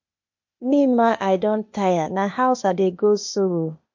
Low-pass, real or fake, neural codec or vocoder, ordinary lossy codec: 7.2 kHz; fake; codec, 16 kHz, 0.8 kbps, ZipCodec; MP3, 48 kbps